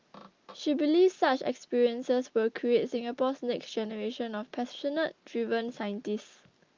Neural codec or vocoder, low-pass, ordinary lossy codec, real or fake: none; 7.2 kHz; Opus, 24 kbps; real